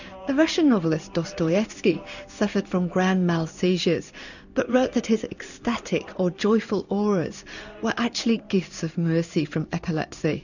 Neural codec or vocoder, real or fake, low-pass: codec, 16 kHz in and 24 kHz out, 1 kbps, XY-Tokenizer; fake; 7.2 kHz